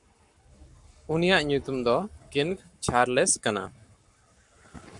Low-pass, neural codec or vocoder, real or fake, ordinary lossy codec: 10.8 kHz; codec, 44.1 kHz, 7.8 kbps, Pupu-Codec; fake; MP3, 96 kbps